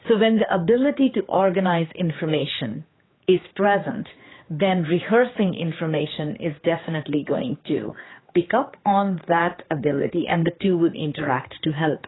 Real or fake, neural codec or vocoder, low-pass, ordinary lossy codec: fake; codec, 16 kHz, 4 kbps, X-Codec, HuBERT features, trained on general audio; 7.2 kHz; AAC, 16 kbps